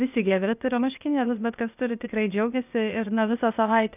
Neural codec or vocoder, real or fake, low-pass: codec, 16 kHz, 0.8 kbps, ZipCodec; fake; 3.6 kHz